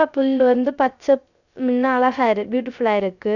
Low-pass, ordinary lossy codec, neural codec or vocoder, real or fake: 7.2 kHz; none; codec, 16 kHz, 0.3 kbps, FocalCodec; fake